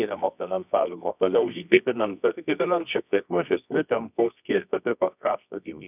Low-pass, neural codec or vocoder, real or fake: 3.6 kHz; codec, 24 kHz, 0.9 kbps, WavTokenizer, medium music audio release; fake